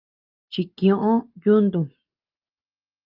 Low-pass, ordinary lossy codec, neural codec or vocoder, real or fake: 5.4 kHz; Opus, 24 kbps; none; real